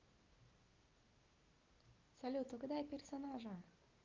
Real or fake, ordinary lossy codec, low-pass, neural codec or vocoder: real; Opus, 32 kbps; 7.2 kHz; none